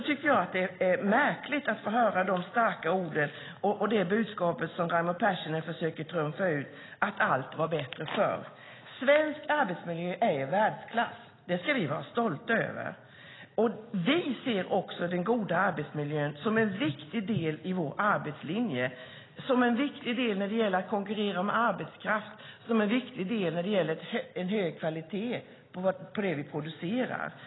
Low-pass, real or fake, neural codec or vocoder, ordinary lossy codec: 7.2 kHz; real; none; AAC, 16 kbps